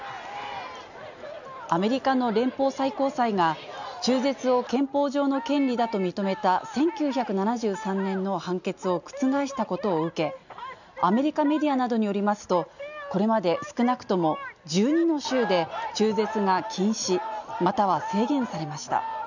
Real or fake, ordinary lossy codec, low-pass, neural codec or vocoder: real; none; 7.2 kHz; none